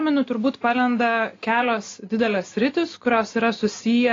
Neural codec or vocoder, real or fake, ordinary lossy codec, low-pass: none; real; AAC, 32 kbps; 7.2 kHz